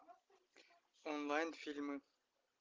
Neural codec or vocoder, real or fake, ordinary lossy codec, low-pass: none; real; Opus, 24 kbps; 7.2 kHz